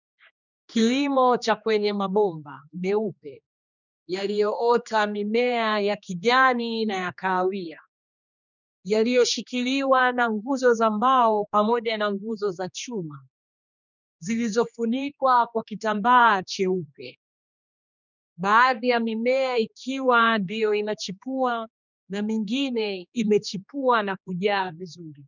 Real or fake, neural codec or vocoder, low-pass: fake; codec, 16 kHz, 2 kbps, X-Codec, HuBERT features, trained on general audio; 7.2 kHz